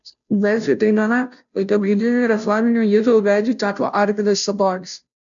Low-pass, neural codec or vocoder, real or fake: 7.2 kHz; codec, 16 kHz, 0.5 kbps, FunCodec, trained on Chinese and English, 25 frames a second; fake